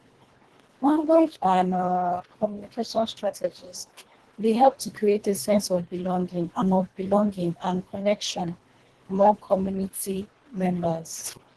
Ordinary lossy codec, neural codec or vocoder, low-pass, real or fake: Opus, 16 kbps; codec, 24 kHz, 1.5 kbps, HILCodec; 10.8 kHz; fake